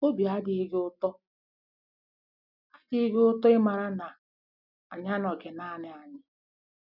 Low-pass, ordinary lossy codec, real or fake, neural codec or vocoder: 5.4 kHz; none; real; none